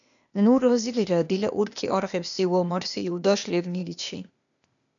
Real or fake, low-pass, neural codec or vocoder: fake; 7.2 kHz; codec, 16 kHz, 0.8 kbps, ZipCodec